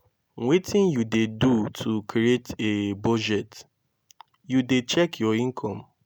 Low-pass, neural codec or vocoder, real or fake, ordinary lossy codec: 19.8 kHz; none; real; none